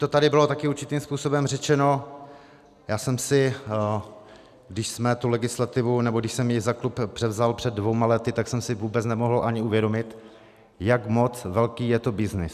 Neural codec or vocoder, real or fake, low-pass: none; real; 14.4 kHz